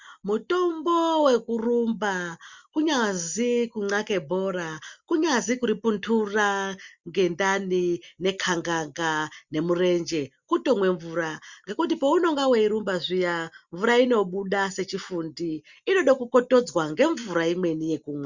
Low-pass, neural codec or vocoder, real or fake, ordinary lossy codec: 7.2 kHz; none; real; Opus, 64 kbps